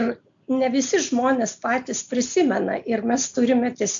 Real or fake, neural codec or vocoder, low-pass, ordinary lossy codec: real; none; 7.2 kHz; AAC, 64 kbps